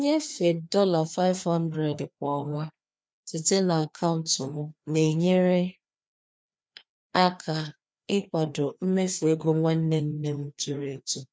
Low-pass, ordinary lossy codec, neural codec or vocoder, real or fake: none; none; codec, 16 kHz, 2 kbps, FreqCodec, larger model; fake